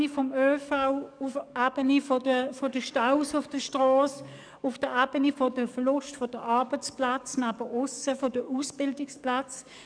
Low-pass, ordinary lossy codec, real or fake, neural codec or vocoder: 9.9 kHz; none; fake; codec, 44.1 kHz, 7.8 kbps, DAC